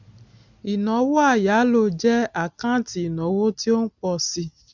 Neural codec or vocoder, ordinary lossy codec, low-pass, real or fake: none; none; 7.2 kHz; real